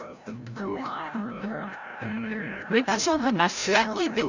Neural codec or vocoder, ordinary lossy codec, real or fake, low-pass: codec, 16 kHz, 0.5 kbps, FreqCodec, larger model; none; fake; 7.2 kHz